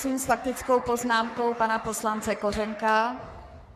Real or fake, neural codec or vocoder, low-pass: fake; codec, 44.1 kHz, 3.4 kbps, Pupu-Codec; 14.4 kHz